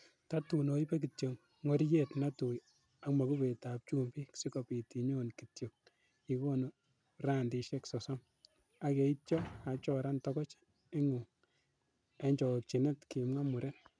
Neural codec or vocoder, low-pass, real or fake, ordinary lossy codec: none; 9.9 kHz; real; none